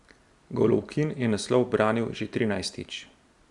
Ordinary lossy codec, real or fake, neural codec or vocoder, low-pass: Opus, 64 kbps; real; none; 10.8 kHz